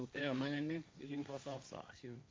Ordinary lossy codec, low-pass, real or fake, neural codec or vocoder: none; none; fake; codec, 16 kHz, 1.1 kbps, Voila-Tokenizer